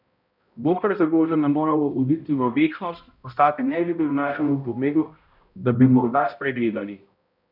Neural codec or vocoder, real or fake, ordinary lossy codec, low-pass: codec, 16 kHz, 0.5 kbps, X-Codec, HuBERT features, trained on balanced general audio; fake; none; 5.4 kHz